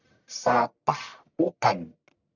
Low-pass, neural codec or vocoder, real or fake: 7.2 kHz; codec, 44.1 kHz, 1.7 kbps, Pupu-Codec; fake